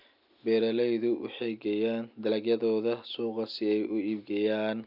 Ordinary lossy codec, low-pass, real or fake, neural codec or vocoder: none; 5.4 kHz; real; none